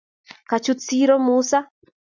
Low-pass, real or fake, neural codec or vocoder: 7.2 kHz; real; none